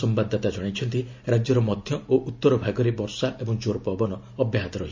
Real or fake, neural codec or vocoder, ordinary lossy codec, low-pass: real; none; MP3, 64 kbps; 7.2 kHz